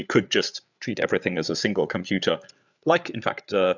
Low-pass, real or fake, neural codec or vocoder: 7.2 kHz; fake; codec, 16 kHz, 8 kbps, FreqCodec, larger model